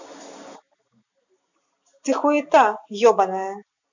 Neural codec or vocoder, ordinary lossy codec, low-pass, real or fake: none; none; 7.2 kHz; real